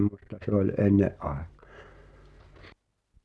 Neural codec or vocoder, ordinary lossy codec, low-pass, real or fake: none; none; none; real